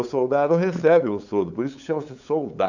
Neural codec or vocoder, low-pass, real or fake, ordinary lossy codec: codec, 16 kHz, 8 kbps, FunCodec, trained on LibriTTS, 25 frames a second; 7.2 kHz; fake; none